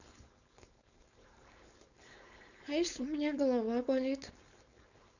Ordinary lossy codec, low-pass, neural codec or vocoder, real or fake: none; 7.2 kHz; codec, 16 kHz, 4.8 kbps, FACodec; fake